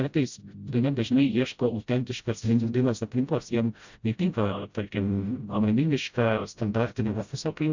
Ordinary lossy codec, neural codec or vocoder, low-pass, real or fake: Opus, 64 kbps; codec, 16 kHz, 0.5 kbps, FreqCodec, smaller model; 7.2 kHz; fake